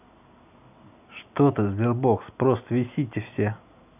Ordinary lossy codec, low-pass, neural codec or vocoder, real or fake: none; 3.6 kHz; none; real